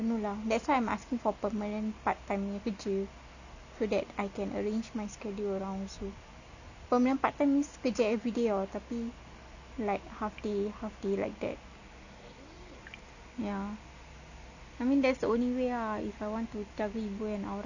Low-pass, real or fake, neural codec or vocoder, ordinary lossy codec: 7.2 kHz; real; none; none